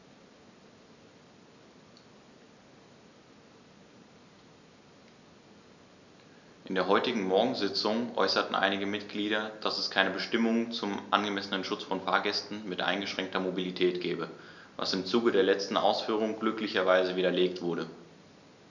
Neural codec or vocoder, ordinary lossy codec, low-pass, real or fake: none; none; 7.2 kHz; real